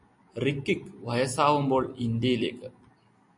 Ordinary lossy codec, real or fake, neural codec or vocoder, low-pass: MP3, 48 kbps; real; none; 10.8 kHz